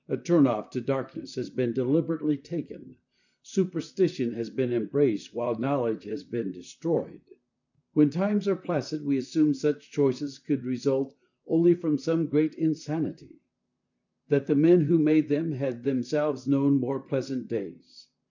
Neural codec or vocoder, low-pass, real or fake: vocoder, 44.1 kHz, 128 mel bands, Pupu-Vocoder; 7.2 kHz; fake